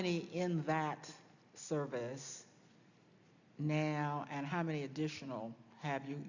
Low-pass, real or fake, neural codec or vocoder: 7.2 kHz; real; none